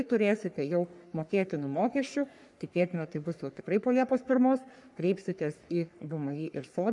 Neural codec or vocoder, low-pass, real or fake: codec, 44.1 kHz, 3.4 kbps, Pupu-Codec; 10.8 kHz; fake